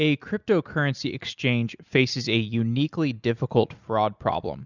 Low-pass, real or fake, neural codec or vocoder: 7.2 kHz; real; none